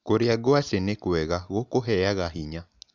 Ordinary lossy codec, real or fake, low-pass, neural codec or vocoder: none; real; 7.2 kHz; none